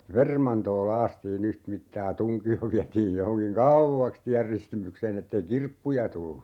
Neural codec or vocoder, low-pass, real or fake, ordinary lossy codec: vocoder, 48 kHz, 128 mel bands, Vocos; 19.8 kHz; fake; none